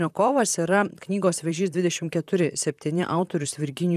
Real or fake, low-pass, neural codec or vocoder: fake; 14.4 kHz; vocoder, 44.1 kHz, 128 mel bands, Pupu-Vocoder